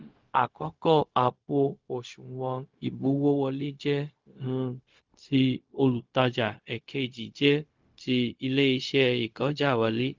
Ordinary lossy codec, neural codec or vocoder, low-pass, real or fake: Opus, 24 kbps; codec, 16 kHz, 0.4 kbps, LongCat-Audio-Codec; 7.2 kHz; fake